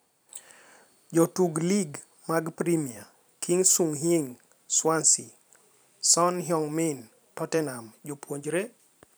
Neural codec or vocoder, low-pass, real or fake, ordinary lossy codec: vocoder, 44.1 kHz, 128 mel bands every 512 samples, BigVGAN v2; none; fake; none